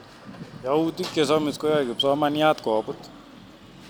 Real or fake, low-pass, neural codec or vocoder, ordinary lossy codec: real; none; none; none